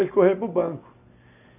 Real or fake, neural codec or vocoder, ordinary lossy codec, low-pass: real; none; none; 3.6 kHz